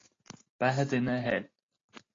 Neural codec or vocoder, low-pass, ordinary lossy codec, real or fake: none; 7.2 kHz; AAC, 32 kbps; real